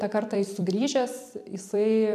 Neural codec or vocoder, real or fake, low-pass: vocoder, 44.1 kHz, 128 mel bands every 256 samples, BigVGAN v2; fake; 14.4 kHz